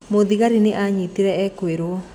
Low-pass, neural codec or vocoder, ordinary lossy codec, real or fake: 19.8 kHz; none; none; real